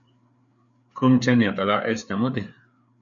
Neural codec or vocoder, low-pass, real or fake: codec, 16 kHz, 4 kbps, FreqCodec, larger model; 7.2 kHz; fake